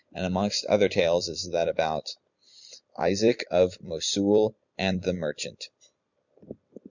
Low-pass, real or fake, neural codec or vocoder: 7.2 kHz; fake; vocoder, 44.1 kHz, 128 mel bands every 256 samples, BigVGAN v2